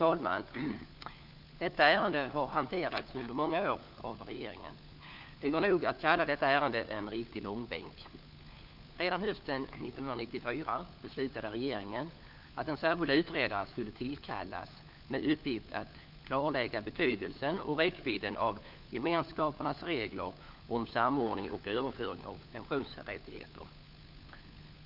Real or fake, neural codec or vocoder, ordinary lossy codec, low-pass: fake; codec, 16 kHz, 4 kbps, FunCodec, trained on LibriTTS, 50 frames a second; none; 5.4 kHz